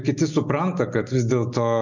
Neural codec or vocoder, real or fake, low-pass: none; real; 7.2 kHz